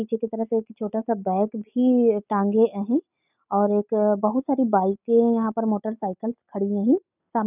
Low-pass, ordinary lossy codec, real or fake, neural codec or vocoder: 3.6 kHz; none; real; none